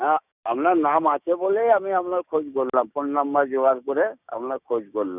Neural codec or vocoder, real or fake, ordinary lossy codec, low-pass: codec, 16 kHz, 6 kbps, DAC; fake; none; 3.6 kHz